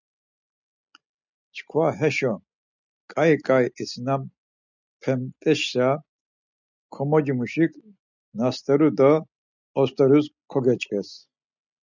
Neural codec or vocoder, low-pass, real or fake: none; 7.2 kHz; real